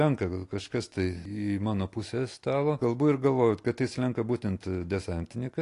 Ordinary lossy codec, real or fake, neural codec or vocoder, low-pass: AAC, 48 kbps; real; none; 10.8 kHz